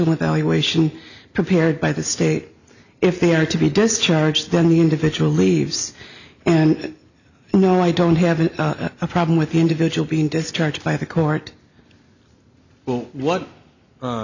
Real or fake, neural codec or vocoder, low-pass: real; none; 7.2 kHz